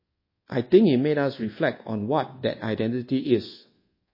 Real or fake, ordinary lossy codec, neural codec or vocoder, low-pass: fake; MP3, 24 kbps; codec, 24 kHz, 1.2 kbps, DualCodec; 5.4 kHz